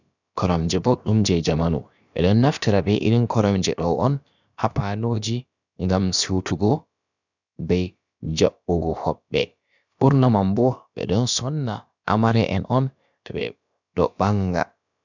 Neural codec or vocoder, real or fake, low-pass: codec, 16 kHz, about 1 kbps, DyCAST, with the encoder's durations; fake; 7.2 kHz